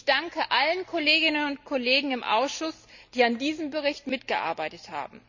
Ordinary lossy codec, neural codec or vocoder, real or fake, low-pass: none; none; real; 7.2 kHz